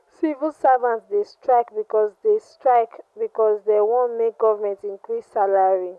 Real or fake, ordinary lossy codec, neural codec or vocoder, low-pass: real; none; none; none